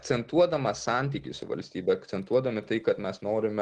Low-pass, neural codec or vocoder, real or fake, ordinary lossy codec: 10.8 kHz; none; real; Opus, 16 kbps